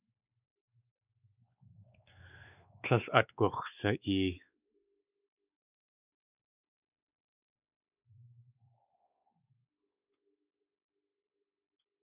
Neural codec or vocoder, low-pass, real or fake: codec, 16 kHz, 4 kbps, X-Codec, WavLM features, trained on Multilingual LibriSpeech; 3.6 kHz; fake